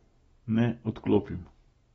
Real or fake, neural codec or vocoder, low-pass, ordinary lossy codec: real; none; 19.8 kHz; AAC, 24 kbps